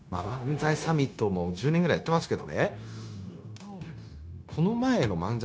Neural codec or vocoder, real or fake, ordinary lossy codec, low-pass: codec, 16 kHz, 0.9 kbps, LongCat-Audio-Codec; fake; none; none